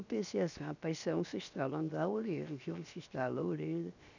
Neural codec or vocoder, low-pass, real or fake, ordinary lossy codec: codec, 16 kHz, 0.7 kbps, FocalCodec; 7.2 kHz; fake; none